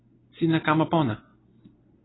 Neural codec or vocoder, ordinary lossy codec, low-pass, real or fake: none; AAC, 16 kbps; 7.2 kHz; real